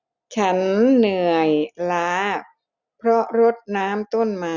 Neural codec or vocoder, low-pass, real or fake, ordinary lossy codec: none; 7.2 kHz; real; none